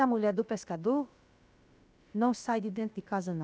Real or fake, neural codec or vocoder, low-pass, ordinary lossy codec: fake; codec, 16 kHz, about 1 kbps, DyCAST, with the encoder's durations; none; none